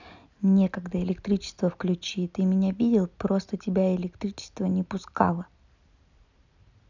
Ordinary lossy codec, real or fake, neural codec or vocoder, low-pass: none; real; none; 7.2 kHz